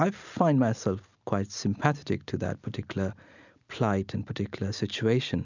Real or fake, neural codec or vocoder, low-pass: real; none; 7.2 kHz